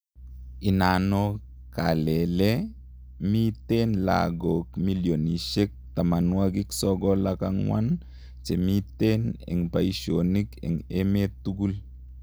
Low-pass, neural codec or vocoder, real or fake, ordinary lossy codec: none; none; real; none